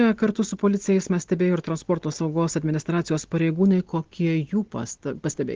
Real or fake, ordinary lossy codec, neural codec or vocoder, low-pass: real; Opus, 16 kbps; none; 7.2 kHz